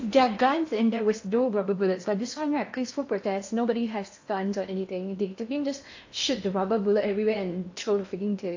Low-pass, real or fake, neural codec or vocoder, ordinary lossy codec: 7.2 kHz; fake; codec, 16 kHz in and 24 kHz out, 0.8 kbps, FocalCodec, streaming, 65536 codes; AAC, 48 kbps